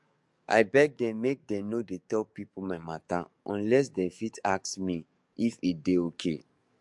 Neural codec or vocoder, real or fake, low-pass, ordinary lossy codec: codec, 44.1 kHz, 7.8 kbps, DAC; fake; 10.8 kHz; MP3, 64 kbps